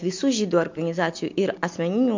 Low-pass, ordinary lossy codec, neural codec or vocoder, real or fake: 7.2 kHz; MP3, 48 kbps; none; real